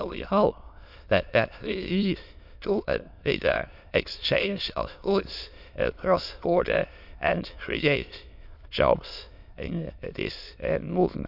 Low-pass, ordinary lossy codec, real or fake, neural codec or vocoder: 5.4 kHz; none; fake; autoencoder, 22.05 kHz, a latent of 192 numbers a frame, VITS, trained on many speakers